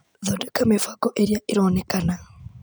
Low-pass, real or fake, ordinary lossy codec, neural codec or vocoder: none; real; none; none